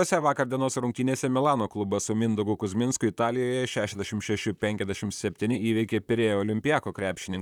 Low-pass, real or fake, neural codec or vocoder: 19.8 kHz; fake; vocoder, 44.1 kHz, 128 mel bands, Pupu-Vocoder